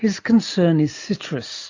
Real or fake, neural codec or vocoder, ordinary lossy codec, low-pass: real; none; AAC, 48 kbps; 7.2 kHz